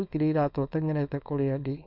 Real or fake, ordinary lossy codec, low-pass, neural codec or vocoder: fake; none; 5.4 kHz; codec, 16 kHz, 4.8 kbps, FACodec